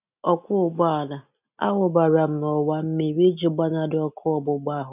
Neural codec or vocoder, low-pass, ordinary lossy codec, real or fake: none; 3.6 kHz; none; real